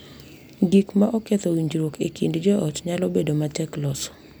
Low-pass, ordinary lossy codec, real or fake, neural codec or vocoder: none; none; real; none